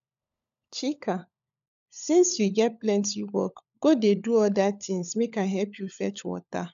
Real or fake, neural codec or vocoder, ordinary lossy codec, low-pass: fake; codec, 16 kHz, 16 kbps, FunCodec, trained on LibriTTS, 50 frames a second; none; 7.2 kHz